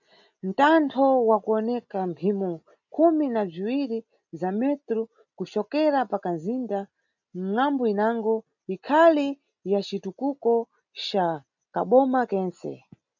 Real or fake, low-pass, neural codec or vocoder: real; 7.2 kHz; none